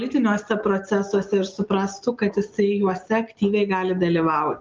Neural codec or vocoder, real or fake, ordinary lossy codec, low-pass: none; real; Opus, 24 kbps; 7.2 kHz